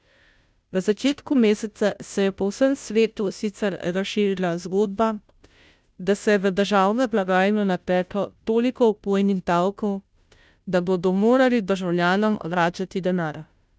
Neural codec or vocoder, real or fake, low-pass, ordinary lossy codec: codec, 16 kHz, 0.5 kbps, FunCodec, trained on Chinese and English, 25 frames a second; fake; none; none